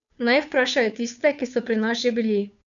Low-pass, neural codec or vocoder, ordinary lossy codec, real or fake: 7.2 kHz; codec, 16 kHz, 2 kbps, FunCodec, trained on Chinese and English, 25 frames a second; none; fake